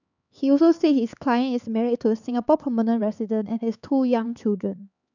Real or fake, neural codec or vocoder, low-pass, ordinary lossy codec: fake; codec, 16 kHz, 4 kbps, X-Codec, HuBERT features, trained on LibriSpeech; 7.2 kHz; none